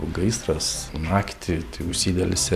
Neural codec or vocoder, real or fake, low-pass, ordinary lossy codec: vocoder, 44.1 kHz, 128 mel bands every 256 samples, BigVGAN v2; fake; 14.4 kHz; AAC, 96 kbps